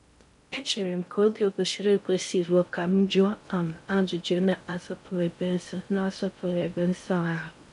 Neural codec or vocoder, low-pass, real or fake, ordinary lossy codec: codec, 16 kHz in and 24 kHz out, 0.6 kbps, FocalCodec, streaming, 4096 codes; 10.8 kHz; fake; none